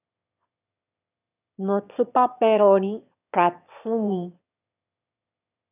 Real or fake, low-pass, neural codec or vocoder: fake; 3.6 kHz; autoencoder, 22.05 kHz, a latent of 192 numbers a frame, VITS, trained on one speaker